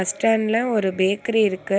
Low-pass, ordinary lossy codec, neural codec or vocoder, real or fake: none; none; none; real